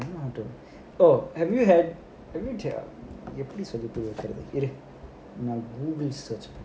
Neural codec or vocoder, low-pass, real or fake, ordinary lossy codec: none; none; real; none